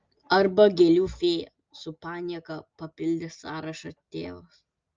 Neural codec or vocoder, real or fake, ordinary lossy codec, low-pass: none; real; Opus, 24 kbps; 7.2 kHz